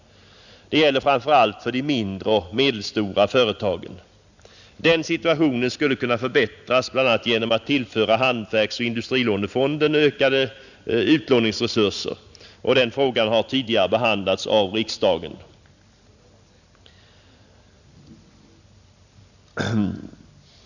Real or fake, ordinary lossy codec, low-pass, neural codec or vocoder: real; none; 7.2 kHz; none